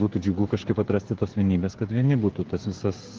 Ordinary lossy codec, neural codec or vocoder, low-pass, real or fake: Opus, 16 kbps; codec, 16 kHz, 16 kbps, FreqCodec, smaller model; 7.2 kHz; fake